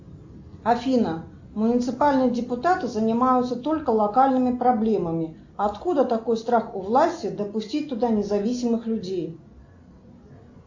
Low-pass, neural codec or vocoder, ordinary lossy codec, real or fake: 7.2 kHz; none; MP3, 48 kbps; real